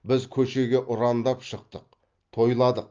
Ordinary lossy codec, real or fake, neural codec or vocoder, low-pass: Opus, 24 kbps; real; none; 7.2 kHz